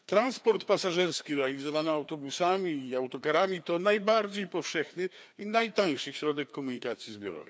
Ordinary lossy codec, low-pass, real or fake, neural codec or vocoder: none; none; fake; codec, 16 kHz, 2 kbps, FreqCodec, larger model